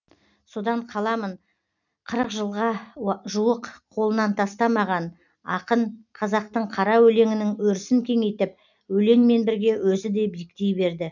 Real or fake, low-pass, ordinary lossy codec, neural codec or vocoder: real; 7.2 kHz; none; none